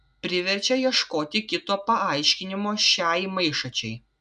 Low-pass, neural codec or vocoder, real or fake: 9.9 kHz; none; real